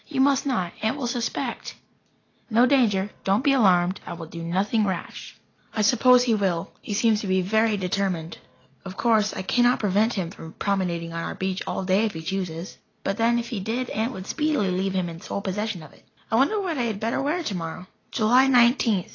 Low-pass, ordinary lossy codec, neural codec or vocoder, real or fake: 7.2 kHz; AAC, 32 kbps; none; real